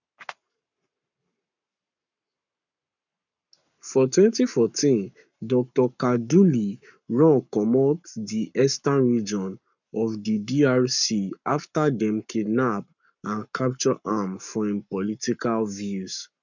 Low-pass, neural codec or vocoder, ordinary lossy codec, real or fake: 7.2 kHz; codec, 44.1 kHz, 7.8 kbps, Pupu-Codec; none; fake